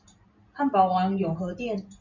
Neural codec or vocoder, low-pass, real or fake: none; 7.2 kHz; real